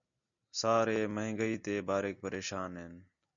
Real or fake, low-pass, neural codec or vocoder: real; 7.2 kHz; none